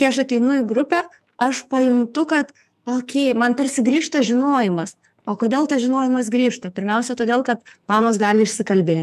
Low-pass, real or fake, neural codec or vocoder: 14.4 kHz; fake; codec, 44.1 kHz, 2.6 kbps, SNAC